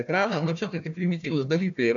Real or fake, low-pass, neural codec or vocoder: fake; 7.2 kHz; codec, 16 kHz, 1 kbps, FunCodec, trained on Chinese and English, 50 frames a second